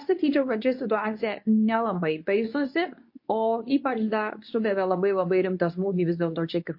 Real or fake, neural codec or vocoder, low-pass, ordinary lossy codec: fake; codec, 24 kHz, 0.9 kbps, WavTokenizer, medium speech release version 2; 5.4 kHz; MP3, 32 kbps